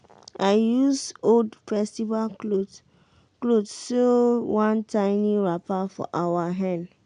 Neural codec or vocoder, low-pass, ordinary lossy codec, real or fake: none; 9.9 kHz; none; real